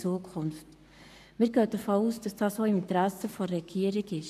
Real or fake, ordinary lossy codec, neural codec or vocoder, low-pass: fake; none; codec, 44.1 kHz, 7.8 kbps, Pupu-Codec; 14.4 kHz